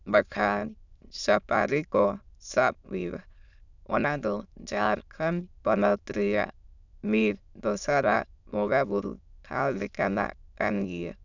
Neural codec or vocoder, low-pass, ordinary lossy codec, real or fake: autoencoder, 22.05 kHz, a latent of 192 numbers a frame, VITS, trained on many speakers; 7.2 kHz; none; fake